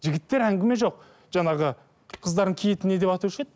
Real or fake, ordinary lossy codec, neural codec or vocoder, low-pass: real; none; none; none